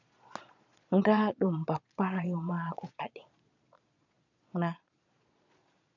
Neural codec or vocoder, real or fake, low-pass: vocoder, 22.05 kHz, 80 mel bands, Vocos; fake; 7.2 kHz